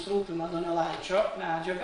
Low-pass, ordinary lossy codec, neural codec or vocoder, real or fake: 9.9 kHz; MP3, 48 kbps; vocoder, 22.05 kHz, 80 mel bands, Vocos; fake